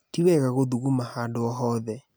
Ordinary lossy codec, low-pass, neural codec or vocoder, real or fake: none; none; none; real